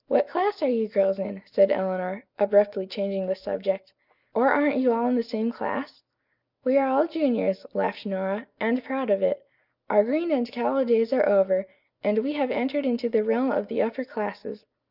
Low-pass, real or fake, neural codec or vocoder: 5.4 kHz; real; none